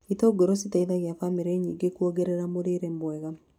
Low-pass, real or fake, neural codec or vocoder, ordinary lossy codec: 19.8 kHz; real; none; none